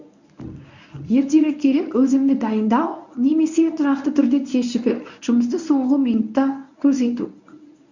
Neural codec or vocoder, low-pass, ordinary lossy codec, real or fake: codec, 24 kHz, 0.9 kbps, WavTokenizer, medium speech release version 1; 7.2 kHz; none; fake